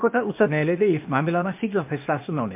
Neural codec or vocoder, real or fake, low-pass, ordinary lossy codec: codec, 16 kHz, 0.8 kbps, ZipCodec; fake; 3.6 kHz; MP3, 32 kbps